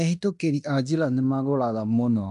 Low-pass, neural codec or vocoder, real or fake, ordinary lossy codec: 10.8 kHz; codec, 16 kHz in and 24 kHz out, 0.9 kbps, LongCat-Audio-Codec, fine tuned four codebook decoder; fake; none